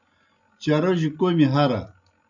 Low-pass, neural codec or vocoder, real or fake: 7.2 kHz; none; real